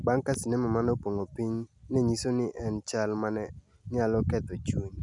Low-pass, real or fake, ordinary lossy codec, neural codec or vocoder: 10.8 kHz; real; none; none